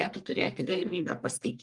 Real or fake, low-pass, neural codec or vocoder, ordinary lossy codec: fake; 10.8 kHz; codec, 44.1 kHz, 1.7 kbps, Pupu-Codec; Opus, 32 kbps